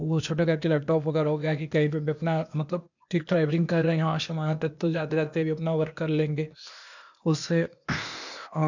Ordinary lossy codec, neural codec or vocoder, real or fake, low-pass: none; codec, 16 kHz, 0.8 kbps, ZipCodec; fake; 7.2 kHz